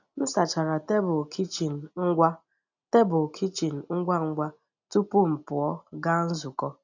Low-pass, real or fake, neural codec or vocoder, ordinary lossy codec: 7.2 kHz; real; none; none